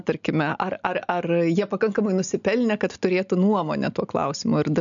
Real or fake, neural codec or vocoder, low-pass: real; none; 7.2 kHz